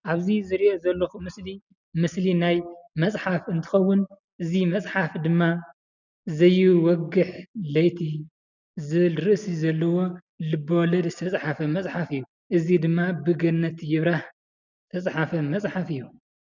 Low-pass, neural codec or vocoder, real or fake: 7.2 kHz; none; real